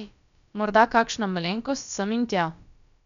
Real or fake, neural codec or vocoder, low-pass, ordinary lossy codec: fake; codec, 16 kHz, about 1 kbps, DyCAST, with the encoder's durations; 7.2 kHz; none